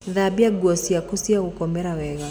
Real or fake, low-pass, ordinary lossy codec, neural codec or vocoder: real; none; none; none